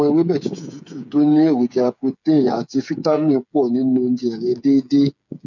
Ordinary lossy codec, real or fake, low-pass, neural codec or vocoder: none; fake; 7.2 kHz; codec, 16 kHz, 8 kbps, FreqCodec, smaller model